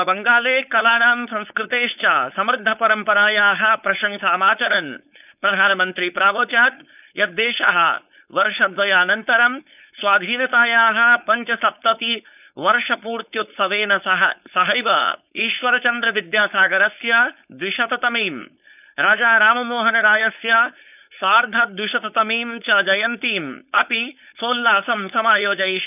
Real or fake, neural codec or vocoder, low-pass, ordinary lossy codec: fake; codec, 16 kHz, 4.8 kbps, FACodec; 3.6 kHz; none